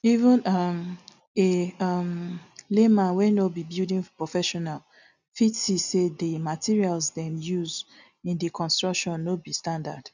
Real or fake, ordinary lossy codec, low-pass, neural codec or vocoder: real; none; 7.2 kHz; none